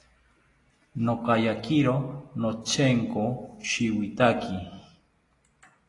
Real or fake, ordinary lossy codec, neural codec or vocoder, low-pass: real; AAC, 32 kbps; none; 10.8 kHz